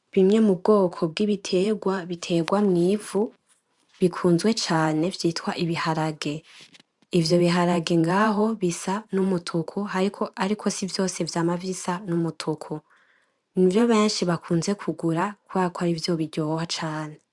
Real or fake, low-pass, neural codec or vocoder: fake; 10.8 kHz; vocoder, 44.1 kHz, 128 mel bands every 512 samples, BigVGAN v2